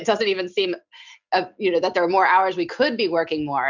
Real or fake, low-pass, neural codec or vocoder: real; 7.2 kHz; none